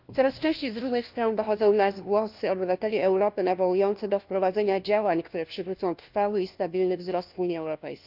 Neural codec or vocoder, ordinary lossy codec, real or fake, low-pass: codec, 16 kHz, 1 kbps, FunCodec, trained on LibriTTS, 50 frames a second; Opus, 24 kbps; fake; 5.4 kHz